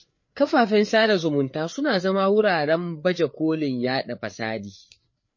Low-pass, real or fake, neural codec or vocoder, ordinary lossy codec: 7.2 kHz; fake; codec, 16 kHz, 4 kbps, FreqCodec, larger model; MP3, 32 kbps